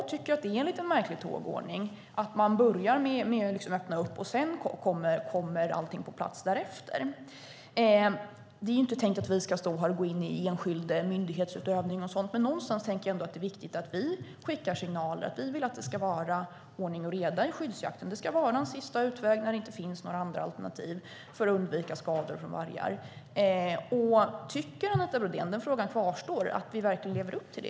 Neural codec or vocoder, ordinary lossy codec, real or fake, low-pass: none; none; real; none